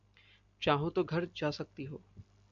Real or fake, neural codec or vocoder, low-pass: real; none; 7.2 kHz